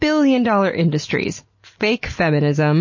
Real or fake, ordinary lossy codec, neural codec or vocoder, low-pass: real; MP3, 32 kbps; none; 7.2 kHz